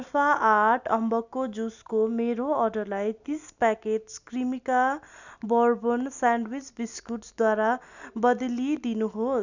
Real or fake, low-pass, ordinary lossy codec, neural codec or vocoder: real; 7.2 kHz; none; none